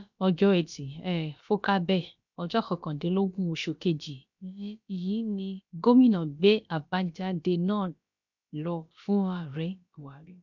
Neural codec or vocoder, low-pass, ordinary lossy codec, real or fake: codec, 16 kHz, about 1 kbps, DyCAST, with the encoder's durations; 7.2 kHz; none; fake